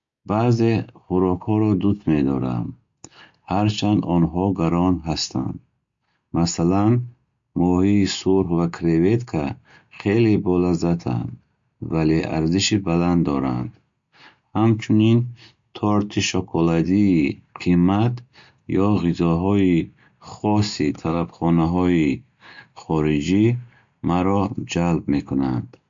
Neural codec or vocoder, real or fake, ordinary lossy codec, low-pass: none; real; MP3, 48 kbps; 7.2 kHz